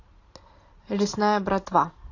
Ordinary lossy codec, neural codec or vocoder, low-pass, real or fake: AAC, 32 kbps; none; 7.2 kHz; real